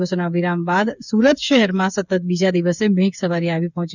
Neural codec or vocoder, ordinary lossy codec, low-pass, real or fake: codec, 16 kHz, 8 kbps, FreqCodec, smaller model; none; 7.2 kHz; fake